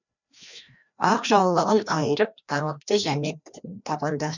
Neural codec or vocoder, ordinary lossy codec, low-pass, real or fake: codec, 16 kHz, 1 kbps, FreqCodec, larger model; none; 7.2 kHz; fake